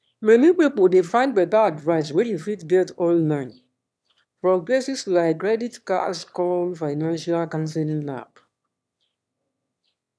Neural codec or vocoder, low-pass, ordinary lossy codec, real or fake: autoencoder, 22.05 kHz, a latent of 192 numbers a frame, VITS, trained on one speaker; none; none; fake